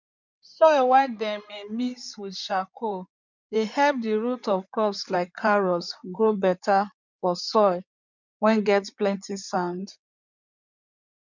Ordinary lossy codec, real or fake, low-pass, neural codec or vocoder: none; fake; 7.2 kHz; codec, 16 kHz in and 24 kHz out, 2.2 kbps, FireRedTTS-2 codec